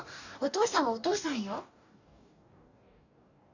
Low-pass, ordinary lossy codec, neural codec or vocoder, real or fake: 7.2 kHz; none; codec, 44.1 kHz, 2.6 kbps, DAC; fake